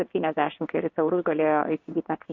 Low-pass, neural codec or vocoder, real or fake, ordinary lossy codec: 7.2 kHz; codec, 16 kHz in and 24 kHz out, 1 kbps, XY-Tokenizer; fake; MP3, 64 kbps